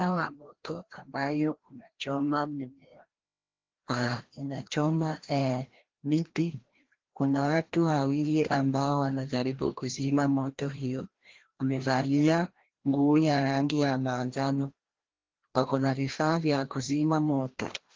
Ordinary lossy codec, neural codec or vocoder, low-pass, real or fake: Opus, 16 kbps; codec, 16 kHz, 1 kbps, FreqCodec, larger model; 7.2 kHz; fake